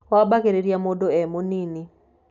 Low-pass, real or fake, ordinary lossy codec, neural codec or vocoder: 7.2 kHz; real; none; none